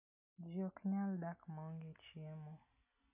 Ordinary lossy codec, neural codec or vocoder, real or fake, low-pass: none; none; real; 3.6 kHz